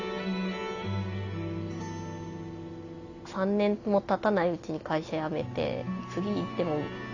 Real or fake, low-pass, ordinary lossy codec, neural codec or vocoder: real; 7.2 kHz; none; none